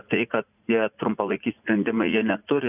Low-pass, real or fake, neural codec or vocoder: 3.6 kHz; fake; vocoder, 44.1 kHz, 80 mel bands, Vocos